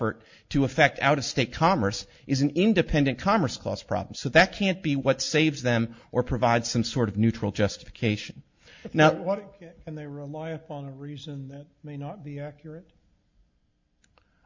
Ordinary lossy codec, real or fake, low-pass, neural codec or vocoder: MP3, 48 kbps; real; 7.2 kHz; none